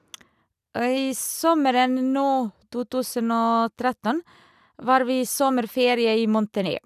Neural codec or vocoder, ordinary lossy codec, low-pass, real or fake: none; none; 14.4 kHz; real